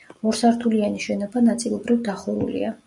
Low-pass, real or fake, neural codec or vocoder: 10.8 kHz; real; none